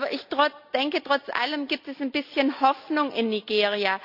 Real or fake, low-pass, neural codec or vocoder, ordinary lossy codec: real; 5.4 kHz; none; none